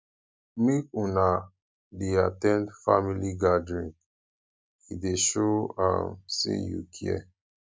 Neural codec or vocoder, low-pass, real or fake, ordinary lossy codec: none; none; real; none